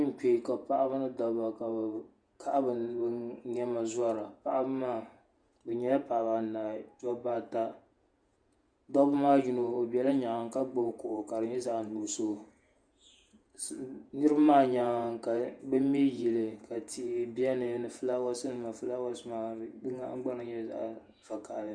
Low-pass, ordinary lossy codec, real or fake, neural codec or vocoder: 9.9 kHz; Opus, 64 kbps; fake; vocoder, 48 kHz, 128 mel bands, Vocos